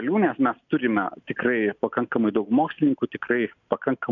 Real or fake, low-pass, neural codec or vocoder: real; 7.2 kHz; none